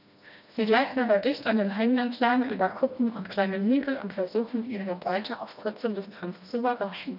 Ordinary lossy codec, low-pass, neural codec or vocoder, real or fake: none; 5.4 kHz; codec, 16 kHz, 1 kbps, FreqCodec, smaller model; fake